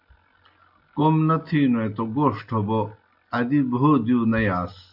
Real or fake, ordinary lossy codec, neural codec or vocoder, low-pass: real; AAC, 48 kbps; none; 5.4 kHz